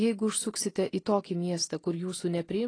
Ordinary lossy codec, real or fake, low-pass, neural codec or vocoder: AAC, 32 kbps; real; 9.9 kHz; none